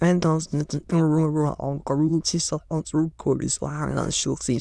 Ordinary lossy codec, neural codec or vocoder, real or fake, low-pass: none; autoencoder, 22.05 kHz, a latent of 192 numbers a frame, VITS, trained on many speakers; fake; none